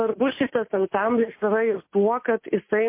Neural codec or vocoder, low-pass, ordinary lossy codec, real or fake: none; 3.6 kHz; MP3, 24 kbps; real